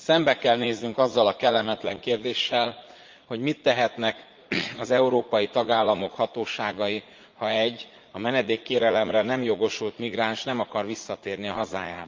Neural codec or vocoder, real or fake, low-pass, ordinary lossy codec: vocoder, 44.1 kHz, 80 mel bands, Vocos; fake; 7.2 kHz; Opus, 32 kbps